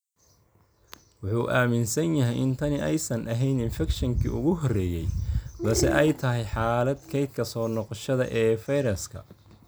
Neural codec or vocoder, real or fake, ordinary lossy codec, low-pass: none; real; none; none